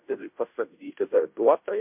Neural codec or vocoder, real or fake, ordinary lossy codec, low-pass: codec, 16 kHz, 0.5 kbps, FunCodec, trained on Chinese and English, 25 frames a second; fake; MP3, 32 kbps; 3.6 kHz